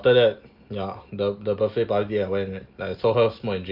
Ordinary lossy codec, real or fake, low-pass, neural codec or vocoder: Opus, 32 kbps; real; 5.4 kHz; none